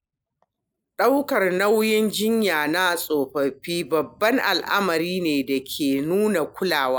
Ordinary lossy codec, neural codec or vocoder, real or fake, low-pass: none; none; real; none